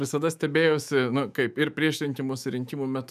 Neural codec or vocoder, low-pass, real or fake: autoencoder, 48 kHz, 128 numbers a frame, DAC-VAE, trained on Japanese speech; 14.4 kHz; fake